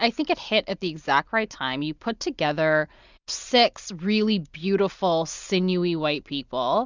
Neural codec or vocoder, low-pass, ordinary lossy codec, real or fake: none; 7.2 kHz; Opus, 64 kbps; real